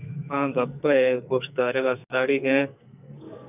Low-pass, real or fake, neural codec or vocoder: 3.6 kHz; fake; codec, 44.1 kHz, 2.6 kbps, SNAC